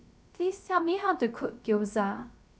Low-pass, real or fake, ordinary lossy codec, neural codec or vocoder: none; fake; none; codec, 16 kHz, 0.3 kbps, FocalCodec